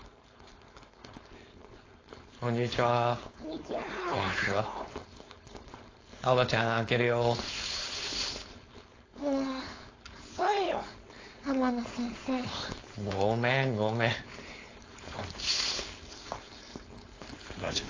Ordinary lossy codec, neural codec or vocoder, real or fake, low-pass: AAC, 32 kbps; codec, 16 kHz, 4.8 kbps, FACodec; fake; 7.2 kHz